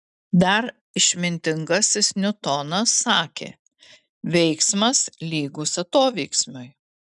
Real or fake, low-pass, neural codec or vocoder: real; 10.8 kHz; none